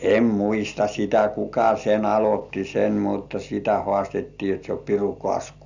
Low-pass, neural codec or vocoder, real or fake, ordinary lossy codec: 7.2 kHz; none; real; none